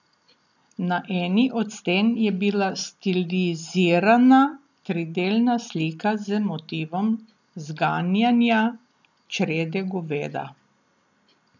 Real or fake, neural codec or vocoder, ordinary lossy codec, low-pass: real; none; none; 7.2 kHz